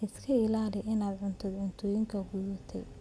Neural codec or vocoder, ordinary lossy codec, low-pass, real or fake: none; none; none; real